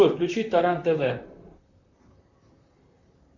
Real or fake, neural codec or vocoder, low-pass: fake; vocoder, 44.1 kHz, 128 mel bands, Pupu-Vocoder; 7.2 kHz